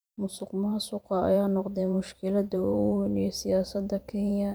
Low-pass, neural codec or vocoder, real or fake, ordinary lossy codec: none; vocoder, 44.1 kHz, 128 mel bands every 512 samples, BigVGAN v2; fake; none